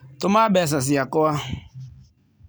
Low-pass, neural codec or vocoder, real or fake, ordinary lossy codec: none; none; real; none